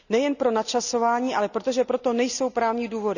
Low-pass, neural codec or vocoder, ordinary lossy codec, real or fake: 7.2 kHz; none; none; real